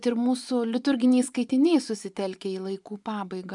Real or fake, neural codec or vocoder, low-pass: fake; vocoder, 24 kHz, 100 mel bands, Vocos; 10.8 kHz